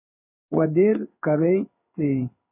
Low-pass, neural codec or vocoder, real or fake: 3.6 kHz; codec, 16 kHz in and 24 kHz out, 1 kbps, XY-Tokenizer; fake